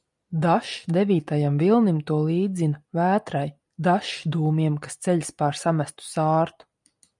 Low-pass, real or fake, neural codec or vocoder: 10.8 kHz; real; none